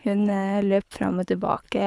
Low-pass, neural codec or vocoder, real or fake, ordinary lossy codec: none; codec, 24 kHz, 6 kbps, HILCodec; fake; none